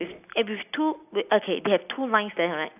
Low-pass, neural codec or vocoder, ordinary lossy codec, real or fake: 3.6 kHz; none; AAC, 32 kbps; real